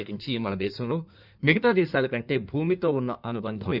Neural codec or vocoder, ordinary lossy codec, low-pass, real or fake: codec, 16 kHz in and 24 kHz out, 1.1 kbps, FireRedTTS-2 codec; none; 5.4 kHz; fake